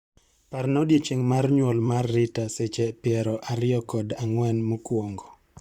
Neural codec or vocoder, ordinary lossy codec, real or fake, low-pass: vocoder, 44.1 kHz, 128 mel bands, Pupu-Vocoder; Opus, 64 kbps; fake; 19.8 kHz